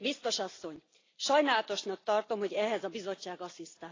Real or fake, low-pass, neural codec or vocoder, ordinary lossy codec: real; 7.2 kHz; none; AAC, 32 kbps